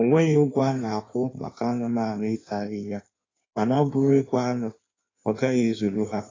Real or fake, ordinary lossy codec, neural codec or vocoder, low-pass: fake; AAC, 32 kbps; codec, 16 kHz in and 24 kHz out, 1.1 kbps, FireRedTTS-2 codec; 7.2 kHz